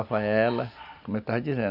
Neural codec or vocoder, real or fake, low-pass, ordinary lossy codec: none; real; 5.4 kHz; none